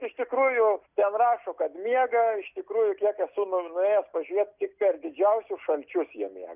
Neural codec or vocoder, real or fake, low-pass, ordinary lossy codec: none; real; 3.6 kHz; Opus, 64 kbps